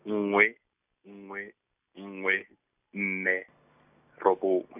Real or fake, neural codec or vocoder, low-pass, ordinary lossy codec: real; none; 3.6 kHz; none